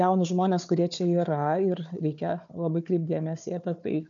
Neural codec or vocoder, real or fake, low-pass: codec, 16 kHz, 4 kbps, FunCodec, trained on Chinese and English, 50 frames a second; fake; 7.2 kHz